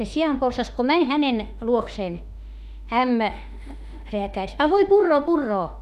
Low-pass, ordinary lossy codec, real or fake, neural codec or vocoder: 14.4 kHz; none; fake; autoencoder, 48 kHz, 32 numbers a frame, DAC-VAE, trained on Japanese speech